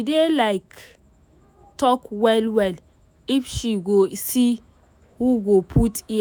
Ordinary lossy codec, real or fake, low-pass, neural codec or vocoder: none; fake; none; autoencoder, 48 kHz, 128 numbers a frame, DAC-VAE, trained on Japanese speech